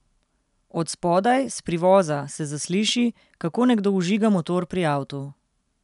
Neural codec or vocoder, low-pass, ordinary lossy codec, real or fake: none; 10.8 kHz; none; real